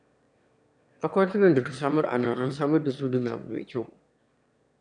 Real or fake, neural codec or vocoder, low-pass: fake; autoencoder, 22.05 kHz, a latent of 192 numbers a frame, VITS, trained on one speaker; 9.9 kHz